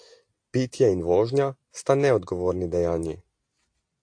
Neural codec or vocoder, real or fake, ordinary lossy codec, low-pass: none; real; AAC, 48 kbps; 9.9 kHz